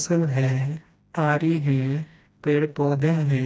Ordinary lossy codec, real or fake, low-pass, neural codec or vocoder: none; fake; none; codec, 16 kHz, 1 kbps, FreqCodec, smaller model